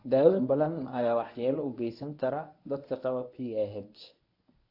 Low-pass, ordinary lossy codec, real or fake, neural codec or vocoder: 5.4 kHz; AAC, 32 kbps; fake; codec, 24 kHz, 0.9 kbps, WavTokenizer, medium speech release version 1